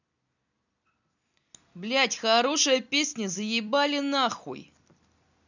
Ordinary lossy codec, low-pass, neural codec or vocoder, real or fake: none; 7.2 kHz; vocoder, 44.1 kHz, 128 mel bands every 256 samples, BigVGAN v2; fake